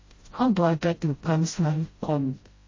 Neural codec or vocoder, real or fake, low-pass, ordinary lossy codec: codec, 16 kHz, 0.5 kbps, FreqCodec, smaller model; fake; 7.2 kHz; MP3, 32 kbps